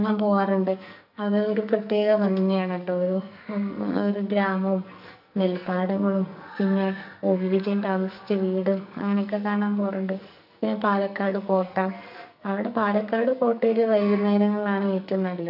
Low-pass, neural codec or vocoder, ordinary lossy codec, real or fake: 5.4 kHz; codec, 44.1 kHz, 2.6 kbps, SNAC; none; fake